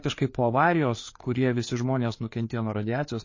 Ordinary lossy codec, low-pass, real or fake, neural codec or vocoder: MP3, 32 kbps; 7.2 kHz; fake; codec, 16 kHz, 4 kbps, FreqCodec, larger model